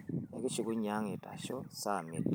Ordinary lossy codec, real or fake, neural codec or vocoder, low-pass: none; real; none; none